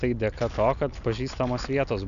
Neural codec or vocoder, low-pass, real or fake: none; 7.2 kHz; real